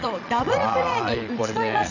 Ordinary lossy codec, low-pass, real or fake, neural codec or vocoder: none; 7.2 kHz; fake; vocoder, 22.05 kHz, 80 mel bands, Vocos